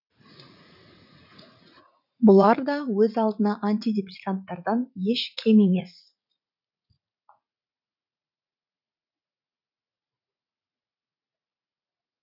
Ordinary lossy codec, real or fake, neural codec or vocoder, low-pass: none; fake; vocoder, 44.1 kHz, 80 mel bands, Vocos; 5.4 kHz